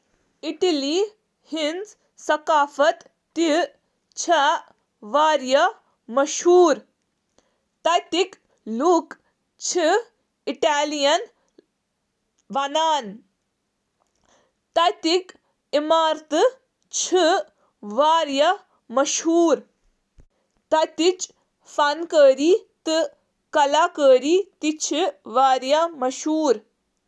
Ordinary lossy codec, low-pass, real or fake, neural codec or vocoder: none; none; real; none